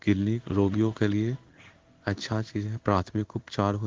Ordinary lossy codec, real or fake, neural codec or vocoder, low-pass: Opus, 16 kbps; fake; codec, 16 kHz in and 24 kHz out, 1 kbps, XY-Tokenizer; 7.2 kHz